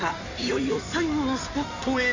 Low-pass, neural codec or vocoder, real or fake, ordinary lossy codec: 7.2 kHz; codec, 16 kHz in and 24 kHz out, 2.2 kbps, FireRedTTS-2 codec; fake; AAC, 48 kbps